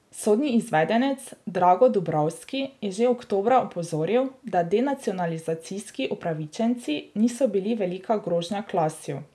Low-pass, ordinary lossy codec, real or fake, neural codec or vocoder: none; none; real; none